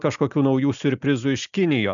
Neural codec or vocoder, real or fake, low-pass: none; real; 7.2 kHz